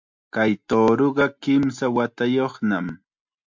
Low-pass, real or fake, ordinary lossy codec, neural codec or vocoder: 7.2 kHz; real; MP3, 64 kbps; none